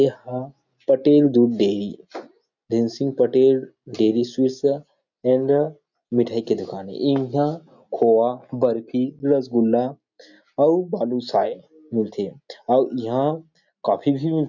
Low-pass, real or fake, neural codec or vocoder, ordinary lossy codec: 7.2 kHz; real; none; none